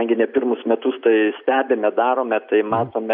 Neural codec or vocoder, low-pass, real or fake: none; 5.4 kHz; real